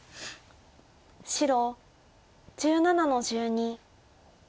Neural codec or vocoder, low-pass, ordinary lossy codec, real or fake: none; none; none; real